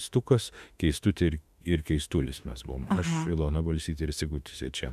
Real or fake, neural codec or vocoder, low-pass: fake; autoencoder, 48 kHz, 32 numbers a frame, DAC-VAE, trained on Japanese speech; 14.4 kHz